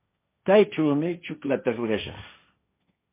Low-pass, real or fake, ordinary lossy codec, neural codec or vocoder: 3.6 kHz; fake; MP3, 24 kbps; codec, 16 kHz, 1.1 kbps, Voila-Tokenizer